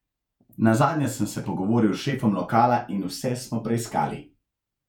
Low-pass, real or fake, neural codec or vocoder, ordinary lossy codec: 19.8 kHz; fake; vocoder, 48 kHz, 128 mel bands, Vocos; none